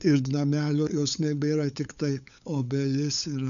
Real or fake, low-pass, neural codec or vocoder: fake; 7.2 kHz; codec, 16 kHz, 8 kbps, FunCodec, trained on LibriTTS, 25 frames a second